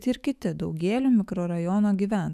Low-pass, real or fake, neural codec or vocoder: 14.4 kHz; fake; autoencoder, 48 kHz, 128 numbers a frame, DAC-VAE, trained on Japanese speech